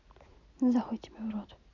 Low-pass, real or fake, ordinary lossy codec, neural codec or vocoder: 7.2 kHz; real; none; none